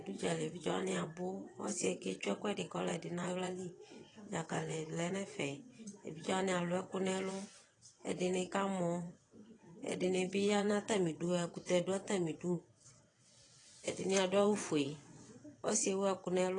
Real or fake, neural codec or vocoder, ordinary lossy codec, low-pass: fake; vocoder, 22.05 kHz, 80 mel bands, WaveNeXt; AAC, 32 kbps; 9.9 kHz